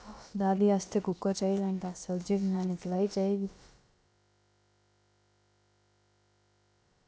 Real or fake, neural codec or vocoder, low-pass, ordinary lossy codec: fake; codec, 16 kHz, about 1 kbps, DyCAST, with the encoder's durations; none; none